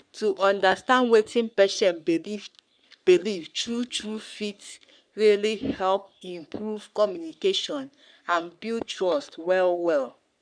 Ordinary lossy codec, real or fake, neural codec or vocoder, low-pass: none; fake; codec, 44.1 kHz, 3.4 kbps, Pupu-Codec; 9.9 kHz